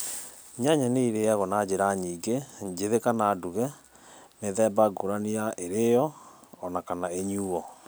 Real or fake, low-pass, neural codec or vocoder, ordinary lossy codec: real; none; none; none